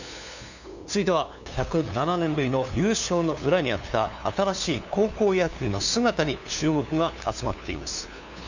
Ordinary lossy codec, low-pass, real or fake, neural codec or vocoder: none; 7.2 kHz; fake; codec, 16 kHz, 2 kbps, FunCodec, trained on LibriTTS, 25 frames a second